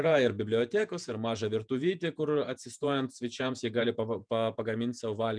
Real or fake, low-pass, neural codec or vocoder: fake; 9.9 kHz; vocoder, 48 kHz, 128 mel bands, Vocos